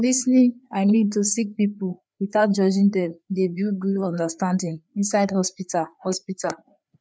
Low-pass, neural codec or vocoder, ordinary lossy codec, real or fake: none; codec, 16 kHz, 4 kbps, FreqCodec, larger model; none; fake